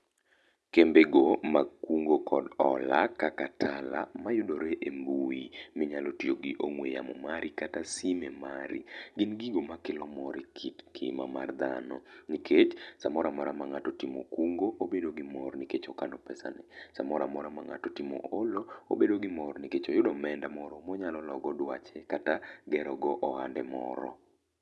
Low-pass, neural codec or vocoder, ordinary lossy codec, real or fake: none; none; none; real